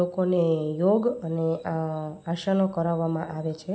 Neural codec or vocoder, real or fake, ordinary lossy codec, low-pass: none; real; none; none